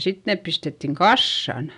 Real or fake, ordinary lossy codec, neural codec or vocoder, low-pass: real; none; none; 10.8 kHz